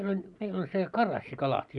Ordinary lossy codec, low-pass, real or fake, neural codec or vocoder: none; 10.8 kHz; real; none